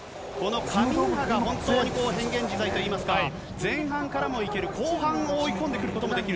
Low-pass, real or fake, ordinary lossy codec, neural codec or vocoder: none; real; none; none